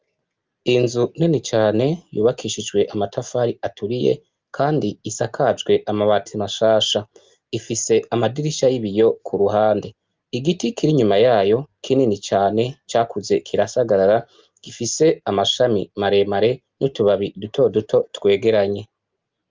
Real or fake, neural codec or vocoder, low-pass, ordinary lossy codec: real; none; 7.2 kHz; Opus, 24 kbps